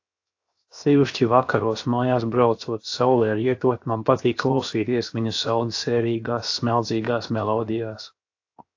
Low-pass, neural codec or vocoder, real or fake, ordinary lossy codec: 7.2 kHz; codec, 16 kHz, 0.7 kbps, FocalCodec; fake; AAC, 48 kbps